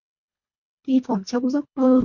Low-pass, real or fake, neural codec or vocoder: 7.2 kHz; fake; codec, 24 kHz, 1.5 kbps, HILCodec